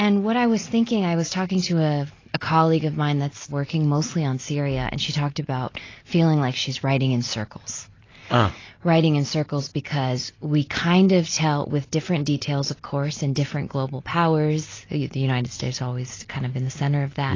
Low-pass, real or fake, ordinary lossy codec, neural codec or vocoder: 7.2 kHz; real; AAC, 32 kbps; none